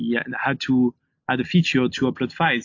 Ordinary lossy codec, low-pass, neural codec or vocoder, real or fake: AAC, 48 kbps; 7.2 kHz; none; real